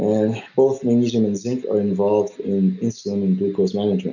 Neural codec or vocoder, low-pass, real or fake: none; 7.2 kHz; real